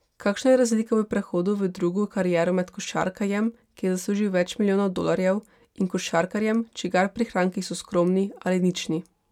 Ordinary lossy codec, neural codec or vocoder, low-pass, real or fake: none; none; 19.8 kHz; real